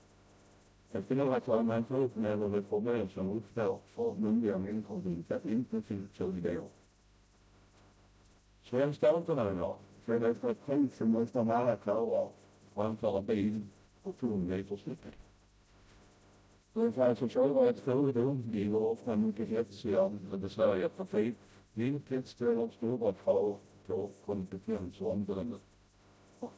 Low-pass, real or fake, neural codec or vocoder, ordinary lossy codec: none; fake; codec, 16 kHz, 0.5 kbps, FreqCodec, smaller model; none